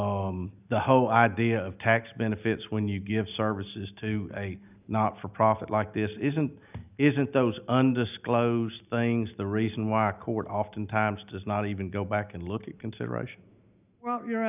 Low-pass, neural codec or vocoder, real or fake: 3.6 kHz; none; real